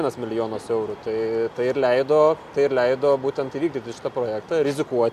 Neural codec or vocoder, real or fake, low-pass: none; real; 14.4 kHz